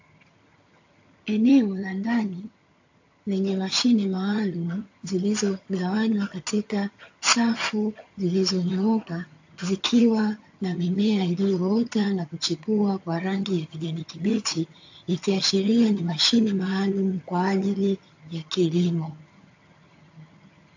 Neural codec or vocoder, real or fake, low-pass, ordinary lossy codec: vocoder, 22.05 kHz, 80 mel bands, HiFi-GAN; fake; 7.2 kHz; AAC, 48 kbps